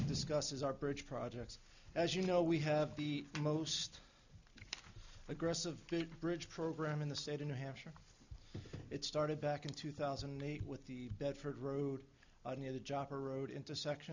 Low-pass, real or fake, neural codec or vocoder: 7.2 kHz; real; none